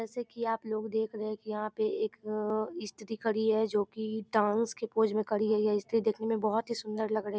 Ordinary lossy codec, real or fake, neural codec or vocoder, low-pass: none; real; none; none